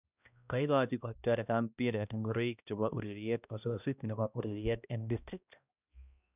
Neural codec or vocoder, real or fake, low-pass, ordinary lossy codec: codec, 16 kHz, 1 kbps, X-Codec, HuBERT features, trained on balanced general audio; fake; 3.6 kHz; none